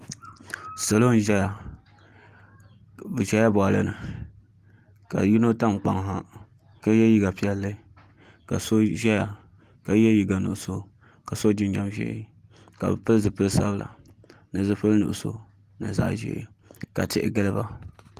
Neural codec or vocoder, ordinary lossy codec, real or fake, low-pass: none; Opus, 24 kbps; real; 14.4 kHz